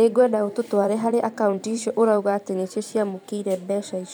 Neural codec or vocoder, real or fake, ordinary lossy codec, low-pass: vocoder, 44.1 kHz, 128 mel bands every 512 samples, BigVGAN v2; fake; none; none